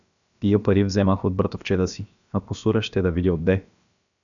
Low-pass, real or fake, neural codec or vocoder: 7.2 kHz; fake; codec, 16 kHz, about 1 kbps, DyCAST, with the encoder's durations